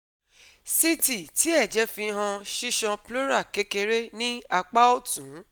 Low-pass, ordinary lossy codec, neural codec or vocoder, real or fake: none; none; none; real